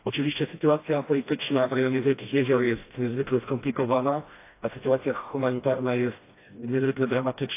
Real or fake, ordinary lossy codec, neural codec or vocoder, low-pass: fake; AAC, 24 kbps; codec, 16 kHz, 1 kbps, FreqCodec, smaller model; 3.6 kHz